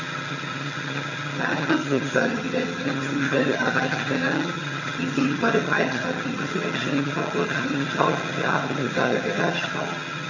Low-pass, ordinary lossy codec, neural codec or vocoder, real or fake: 7.2 kHz; none; vocoder, 22.05 kHz, 80 mel bands, HiFi-GAN; fake